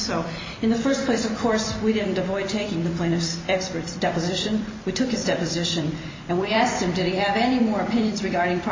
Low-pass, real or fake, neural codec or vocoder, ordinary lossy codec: 7.2 kHz; fake; vocoder, 44.1 kHz, 128 mel bands every 256 samples, BigVGAN v2; MP3, 32 kbps